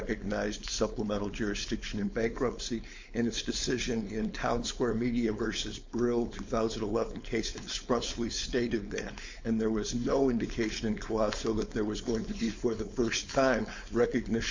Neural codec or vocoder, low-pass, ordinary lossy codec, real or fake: codec, 16 kHz, 4.8 kbps, FACodec; 7.2 kHz; MP3, 48 kbps; fake